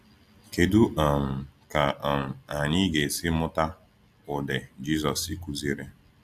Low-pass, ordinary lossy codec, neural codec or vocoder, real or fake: 14.4 kHz; AAC, 96 kbps; none; real